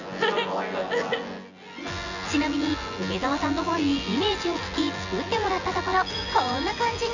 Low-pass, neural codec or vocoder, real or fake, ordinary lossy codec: 7.2 kHz; vocoder, 24 kHz, 100 mel bands, Vocos; fake; none